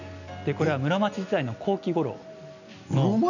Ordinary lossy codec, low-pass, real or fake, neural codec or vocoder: none; 7.2 kHz; real; none